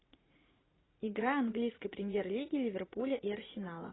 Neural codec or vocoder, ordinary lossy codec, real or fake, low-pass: vocoder, 44.1 kHz, 80 mel bands, Vocos; AAC, 16 kbps; fake; 7.2 kHz